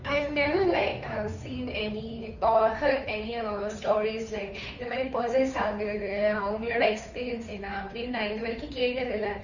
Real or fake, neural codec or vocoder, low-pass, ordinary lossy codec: fake; codec, 16 kHz, 2 kbps, FunCodec, trained on Chinese and English, 25 frames a second; 7.2 kHz; none